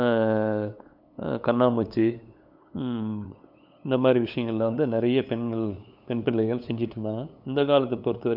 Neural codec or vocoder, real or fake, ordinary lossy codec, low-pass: codec, 16 kHz, 8 kbps, FunCodec, trained on LibriTTS, 25 frames a second; fake; none; 5.4 kHz